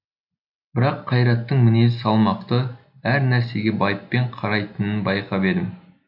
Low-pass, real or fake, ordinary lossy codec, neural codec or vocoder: 5.4 kHz; real; none; none